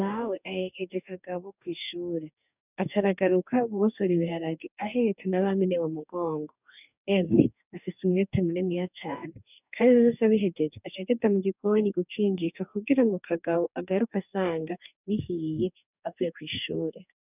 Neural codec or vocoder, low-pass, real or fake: codec, 44.1 kHz, 2.6 kbps, DAC; 3.6 kHz; fake